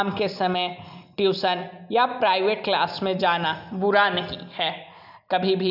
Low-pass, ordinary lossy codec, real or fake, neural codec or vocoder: 5.4 kHz; none; real; none